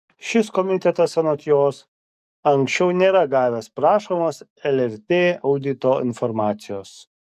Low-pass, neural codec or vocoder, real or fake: 14.4 kHz; codec, 44.1 kHz, 7.8 kbps, DAC; fake